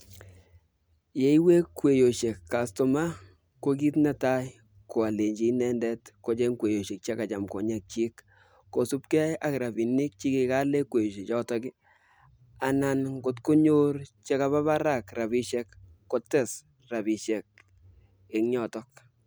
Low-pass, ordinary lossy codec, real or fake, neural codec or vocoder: none; none; real; none